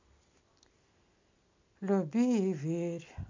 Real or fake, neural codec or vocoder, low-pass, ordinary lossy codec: real; none; 7.2 kHz; MP3, 64 kbps